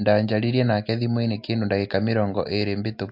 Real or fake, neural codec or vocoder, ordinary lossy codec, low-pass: real; none; MP3, 48 kbps; 5.4 kHz